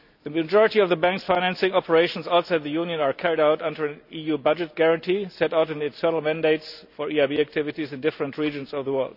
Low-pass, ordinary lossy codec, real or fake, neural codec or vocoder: 5.4 kHz; none; real; none